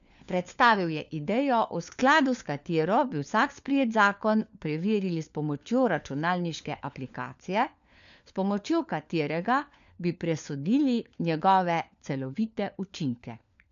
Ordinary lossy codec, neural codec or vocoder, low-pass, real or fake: none; codec, 16 kHz, 4 kbps, FunCodec, trained on LibriTTS, 50 frames a second; 7.2 kHz; fake